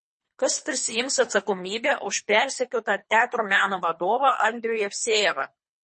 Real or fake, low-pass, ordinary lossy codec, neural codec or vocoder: fake; 10.8 kHz; MP3, 32 kbps; codec, 24 kHz, 3 kbps, HILCodec